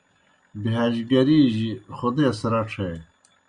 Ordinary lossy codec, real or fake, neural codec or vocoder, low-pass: Opus, 64 kbps; real; none; 9.9 kHz